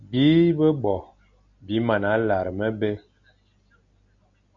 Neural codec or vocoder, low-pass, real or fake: none; 7.2 kHz; real